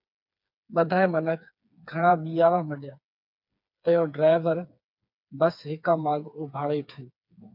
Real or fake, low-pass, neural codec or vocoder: fake; 5.4 kHz; codec, 16 kHz, 4 kbps, FreqCodec, smaller model